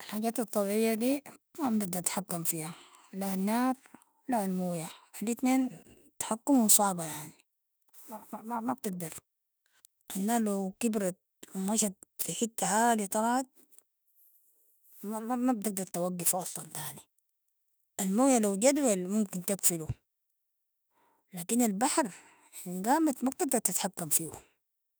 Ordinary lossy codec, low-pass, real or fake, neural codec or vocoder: none; none; fake; autoencoder, 48 kHz, 32 numbers a frame, DAC-VAE, trained on Japanese speech